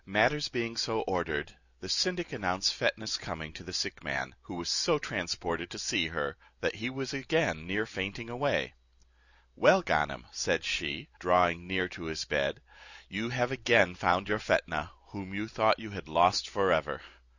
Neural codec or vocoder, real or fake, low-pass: none; real; 7.2 kHz